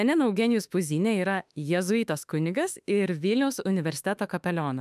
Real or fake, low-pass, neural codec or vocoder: fake; 14.4 kHz; autoencoder, 48 kHz, 32 numbers a frame, DAC-VAE, trained on Japanese speech